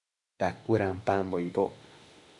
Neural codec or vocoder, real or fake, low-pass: autoencoder, 48 kHz, 32 numbers a frame, DAC-VAE, trained on Japanese speech; fake; 10.8 kHz